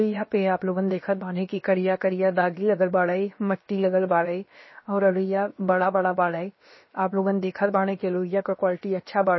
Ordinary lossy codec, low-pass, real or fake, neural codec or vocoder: MP3, 24 kbps; 7.2 kHz; fake; codec, 16 kHz, about 1 kbps, DyCAST, with the encoder's durations